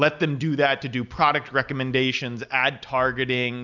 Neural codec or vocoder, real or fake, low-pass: none; real; 7.2 kHz